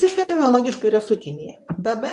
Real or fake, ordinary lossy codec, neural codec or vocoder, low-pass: fake; MP3, 96 kbps; codec, 24 kHz, 0.9 kbps, WavTokenizer, medium speech release version 1; 10.8 kHz